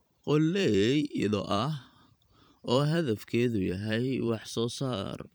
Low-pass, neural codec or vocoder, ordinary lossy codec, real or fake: none; vocoder, 44.1 kHz, 128 mel bands every 512 samples, BigVGAN v2; none; fake